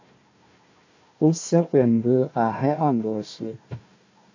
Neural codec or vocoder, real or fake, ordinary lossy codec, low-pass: codec, 16 kHz, 1 kbps, FunCodec, trained on Chinese and English, 50 frames a second; fake; AAC, 48 kbps; 7.2 kHz